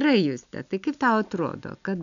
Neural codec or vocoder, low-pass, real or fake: none; 7.2 kHz; real